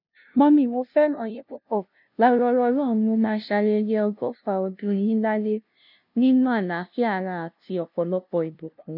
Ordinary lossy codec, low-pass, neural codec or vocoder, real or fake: none; 5.4 kHz; codec, 16 kHz, 0.5 kbps, FunCodec, trained on LibriTTS, 25 frames a second; fake